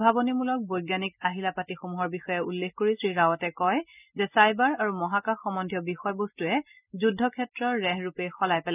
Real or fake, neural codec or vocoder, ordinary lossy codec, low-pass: real; none; none; 3.6 kHz